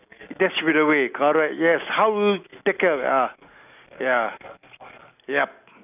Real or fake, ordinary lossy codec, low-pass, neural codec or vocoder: real; none; 3.6 kHz; none